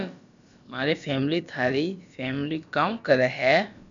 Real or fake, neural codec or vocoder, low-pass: fake; codec, 16 kHz, about 1 kbps, DyCAST, with the encoder's durations; 7.2 kHz